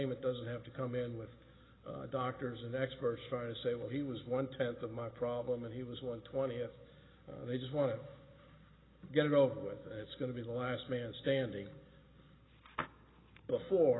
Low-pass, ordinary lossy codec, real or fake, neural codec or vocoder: 7.2 kHz; AAC, 16 kbps; real; none